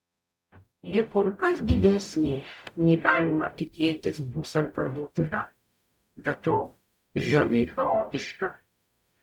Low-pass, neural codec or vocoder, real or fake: 19.8 kHz; codec, 44.1 kHz, 0.9 kbps, DAC; fake